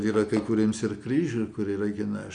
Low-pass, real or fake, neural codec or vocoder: 9.9 kHz; real; none